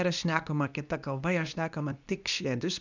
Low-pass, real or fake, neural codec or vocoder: 7.2 kHz; fake; codec, 24 kHz, 0.9 kbps, WavTokenizer, medium speech release version 2